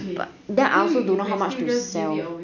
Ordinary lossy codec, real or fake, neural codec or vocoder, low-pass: none; real; none; 7.2 kHz